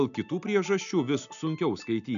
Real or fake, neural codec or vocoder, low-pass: real; none; 7.2 kHz